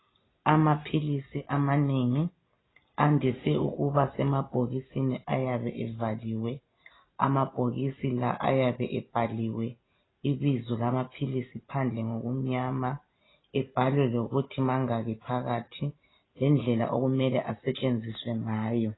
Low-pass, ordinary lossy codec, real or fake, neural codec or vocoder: 7.2 kHz; AAC, 16 kbps; real; none